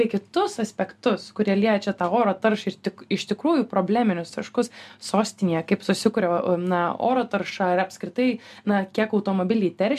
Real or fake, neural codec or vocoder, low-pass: real; none; 14.4 kHz